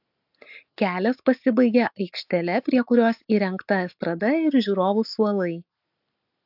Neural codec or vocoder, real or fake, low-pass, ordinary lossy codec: none; real; 5.4 kHz; AAC, 48 kbps